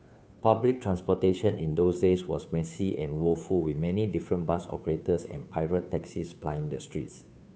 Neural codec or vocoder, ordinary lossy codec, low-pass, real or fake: codec, 16 kHz, 2 kbps, FunCodec, trained on Chinese and English, 25 frames a second; none; none; fake